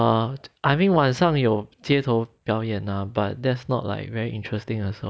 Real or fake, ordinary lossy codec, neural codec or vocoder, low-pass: real; none; none; none